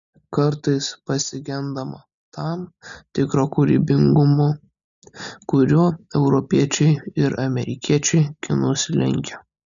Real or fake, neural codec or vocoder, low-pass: real; none; 7.2 kHz